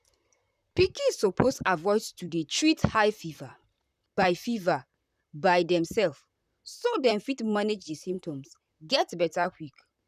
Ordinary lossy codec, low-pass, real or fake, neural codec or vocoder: none; 14.4 kHz; fake; vocoder, 44.1 kHz, 128 mel bands, Pupu-Vocoder